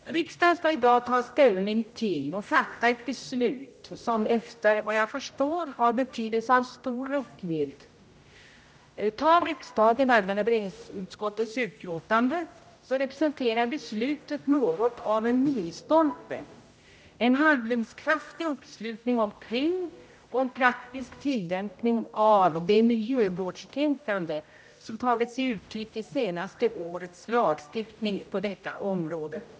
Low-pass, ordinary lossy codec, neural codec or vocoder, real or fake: none; none; codec, 16 kHz, 0.5 kbps, X-Codec, HuBERT features, trained on general audio; fake